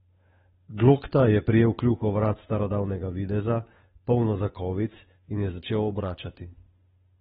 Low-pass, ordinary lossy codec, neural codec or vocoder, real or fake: 7.2 kHz; AAC, 16 kbps; codec, 16 kHz, 8 kbps, FunCodec, trained on Chinese and English, 25 frames a second; fake